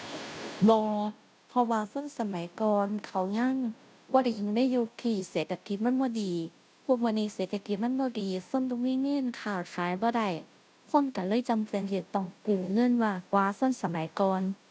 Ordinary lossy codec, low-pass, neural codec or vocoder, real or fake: none; none; codec, 16 kHz, 0.5 kbps, FunCodec, trained on Chinese and English, 25 frames a second; fake